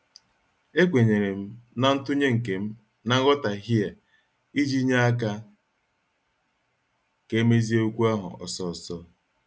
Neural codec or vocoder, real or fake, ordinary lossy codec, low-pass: none; real; none; none